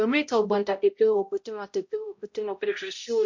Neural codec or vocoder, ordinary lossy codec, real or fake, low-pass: codec, 16 kHz, 0.5 kbps, X-Codec, HuBERT features, trained on balanced general audio; MP3, 48 kbps; fake; 7.2 kHz